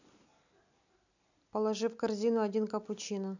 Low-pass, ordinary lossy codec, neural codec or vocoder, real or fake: 7.2 kHz; none; none; real